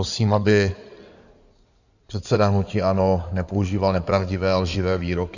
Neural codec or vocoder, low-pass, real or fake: codec, 16 kHz in and 24 kHz out, 2.2 kbps, FireRedTTS-2 codec; 7.2 kHz; fake